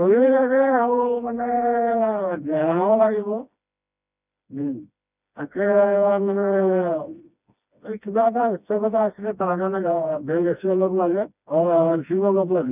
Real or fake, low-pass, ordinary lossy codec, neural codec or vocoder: fake; 3.6 kHz; none; codec, 16 kHz, 1 kbps, FreqCodec, smaller model